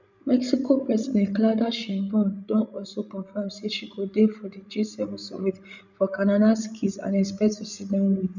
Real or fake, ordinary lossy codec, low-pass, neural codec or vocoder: fake; none; 7.2 kHz; codec, 16 kHz, 16 kbps, FreqCodec, larger model